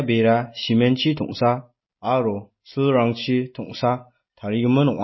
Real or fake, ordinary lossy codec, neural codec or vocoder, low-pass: real; MP3, 24 kbps; none; 7.2 kHz